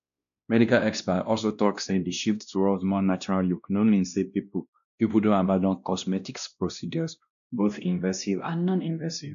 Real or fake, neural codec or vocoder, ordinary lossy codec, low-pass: fake; codec, 16 kHz, 1 kbps, X-Codec, WavLM features, trained on Multilingual LibriSpeech; none; 7.2 kHz